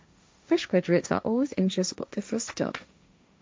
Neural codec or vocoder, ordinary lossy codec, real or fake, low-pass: codec, 16 kHz, 1.1 kbps, Voila-Tokenizer; none; fake; none